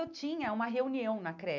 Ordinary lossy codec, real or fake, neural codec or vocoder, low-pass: none; real; none; 7.2 kHz